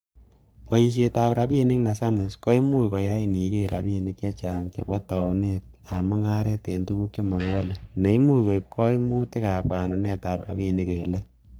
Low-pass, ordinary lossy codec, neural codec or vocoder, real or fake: none; none; codec, 44.1 kHz, 3.4 kbps, Pupu-Codec; fake